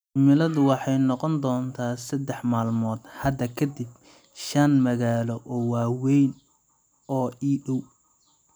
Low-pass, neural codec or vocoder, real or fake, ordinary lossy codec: none; none; real; none